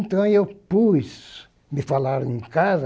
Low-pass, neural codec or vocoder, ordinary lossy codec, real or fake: none; none; none; real